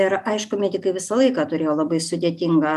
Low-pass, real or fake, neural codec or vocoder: 14.4 kHz; real; none